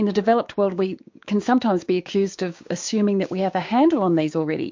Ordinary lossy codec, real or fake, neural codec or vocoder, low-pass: MP3, 48 kbps; fake; codec, 16 kHz, 6 kbps, DAC; 7.2 kHz